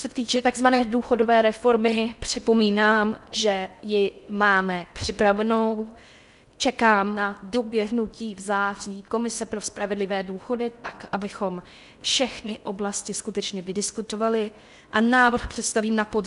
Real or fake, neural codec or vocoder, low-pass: fake; codec, 16 kHz in and 24 kHz out, 0.6 kbps, FocalCodec, streaming, 4096 codes; 10.8 kHz